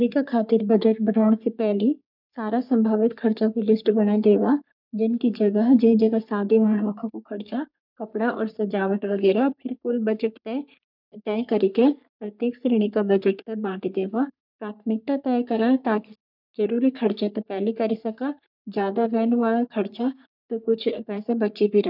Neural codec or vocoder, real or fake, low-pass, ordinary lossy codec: codec, 44.1 kHz, 3.4 kbps, Pupu-Codec; fake; 5.4 kHz; none